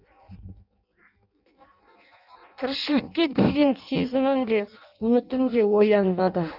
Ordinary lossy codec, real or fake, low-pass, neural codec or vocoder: none; fake; 5.4 kHz; codec, 16 kHz in and 24 kHz out, 0.6 kbps, FireRedTTS-2 codec